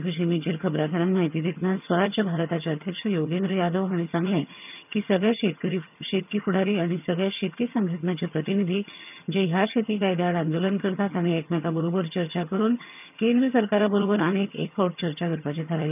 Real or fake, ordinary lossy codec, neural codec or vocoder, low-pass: fake; none; vocoder, 22.05 kHz, 80 mel bands, HiFi-GAN; 3.6 kHz